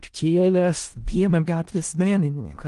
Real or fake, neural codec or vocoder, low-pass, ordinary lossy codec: fake; codec, 16 kHz in and 24 kHz out, 0.4 kbps, LongCat-Audio-Codec, four codebook decoder; 10.8 kHz; Opus, 24 kbps